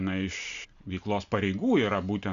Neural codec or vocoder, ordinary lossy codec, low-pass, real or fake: none; AAC, 96 kbps; 7.2 kHz; real